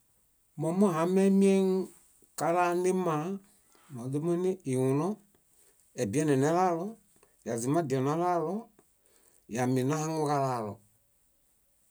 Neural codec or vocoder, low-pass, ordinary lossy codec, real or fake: none; none; none; real